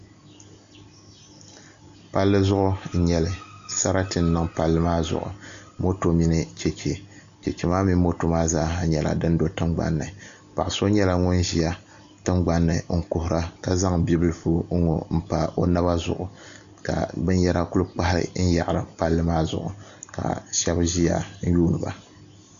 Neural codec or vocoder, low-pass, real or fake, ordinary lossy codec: none; 7.2 kHz; real; AAC, 96 kbps